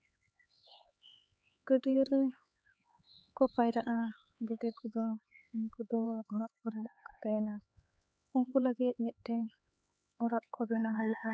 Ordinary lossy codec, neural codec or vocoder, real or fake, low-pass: none; codec, 16 kHz, 4 kbps, X-Codec, HuBERT features, trained on LibriSpeech; fake; none